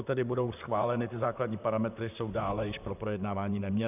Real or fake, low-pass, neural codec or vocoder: fake; 3.6 kHz; vocoder, 44.1 kHz, 128 mel bands, Pupu-Vocoder